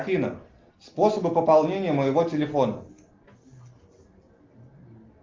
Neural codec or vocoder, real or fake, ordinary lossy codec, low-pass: none; real; Opus, 24 kbps; 7.2 kHz